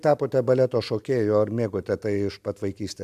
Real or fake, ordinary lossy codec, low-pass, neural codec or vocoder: real; AAC, 96 kbps; 14.4 kHz; none